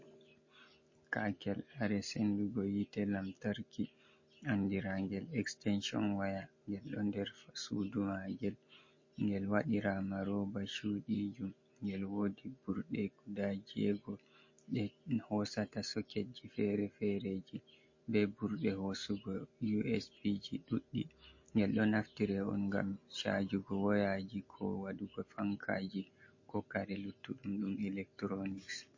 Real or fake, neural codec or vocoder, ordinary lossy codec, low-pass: real; none; MP3, 32 kbps; 7.2 kHz